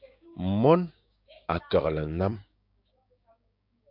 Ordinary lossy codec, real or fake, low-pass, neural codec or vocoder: AAC, 48 kbps; fake; 5.4 kHz; codec, 16 kHz, 6 kbps, DAC